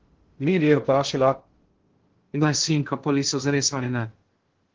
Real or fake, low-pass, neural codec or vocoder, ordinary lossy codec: fake; 7.2 kHz; codec, 16 kHz in and 24 kHz out, 0.6 kbps, FocalCodec, streaming, 2048 codes; Opus, 16 kbps